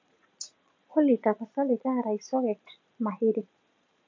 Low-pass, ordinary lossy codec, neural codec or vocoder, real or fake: 7.2 kHz; MP3, 64 kbps; vocoder, 22.05 kHz, 80 mel bands, Vocos; fake